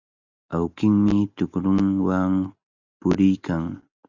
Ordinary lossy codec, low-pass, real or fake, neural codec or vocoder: Opus, 64 kbps; 7.2 kHz; real; none